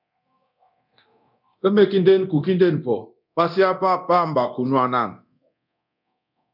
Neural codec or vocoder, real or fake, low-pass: codec, 24 kHz, 0.9 kbps, DualCodec; fake; 5.4 kHz